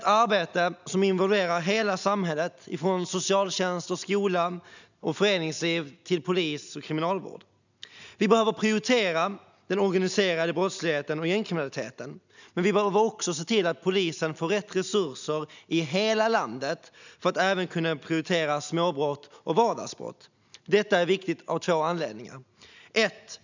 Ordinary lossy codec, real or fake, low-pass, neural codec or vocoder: none; real; 7.2 kHz; none